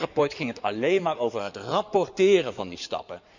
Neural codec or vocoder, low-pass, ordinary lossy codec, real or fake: codec, 16 kHz in and 24 kHz out, 2.2 kbps, FireRedTTS-2 codec; 7.2 kHz; none; fake